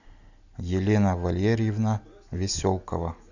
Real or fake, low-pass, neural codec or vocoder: real; 7.2 kHz; none